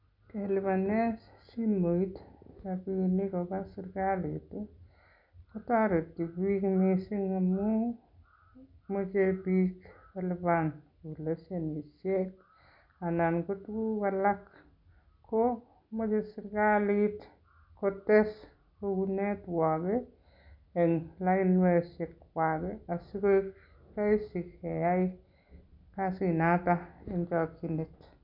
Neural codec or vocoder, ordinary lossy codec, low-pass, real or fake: none; none; 5.4 kHz; real